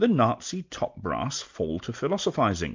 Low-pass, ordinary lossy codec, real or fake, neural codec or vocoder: 7.2 kHz; MP3, 64 kbps; real; none